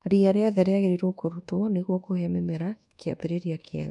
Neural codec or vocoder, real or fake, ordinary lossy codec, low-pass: codec, 24 kHz, 1.2 kbps, DualCodec; fake; none; 10.8 kHz